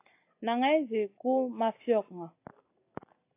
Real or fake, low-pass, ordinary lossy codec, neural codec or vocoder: real; 3.6 kHz; AAC, 24 kbps; none